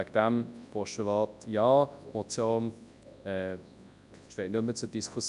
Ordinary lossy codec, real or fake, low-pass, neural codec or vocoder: none; fake; 10.8 kHz; codec, 24 kHz, 0.9 kbps, WavTokenizer, large speech release